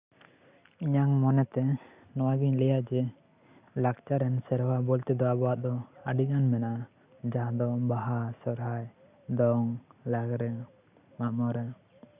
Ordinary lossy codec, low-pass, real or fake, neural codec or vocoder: Opus, 64 kbps; 3.6 kHz; real; none